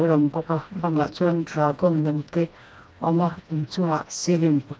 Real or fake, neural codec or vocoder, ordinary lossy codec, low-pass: fake; codec, 16 kHz, 1 kbps, FreqCodec, smaller model; none; none